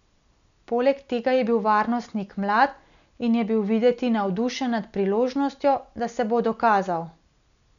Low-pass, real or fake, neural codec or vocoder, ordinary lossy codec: 7.2 kHz; real; none; none